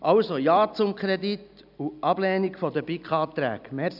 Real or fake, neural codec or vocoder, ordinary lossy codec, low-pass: real; none; none; 5.4 kHz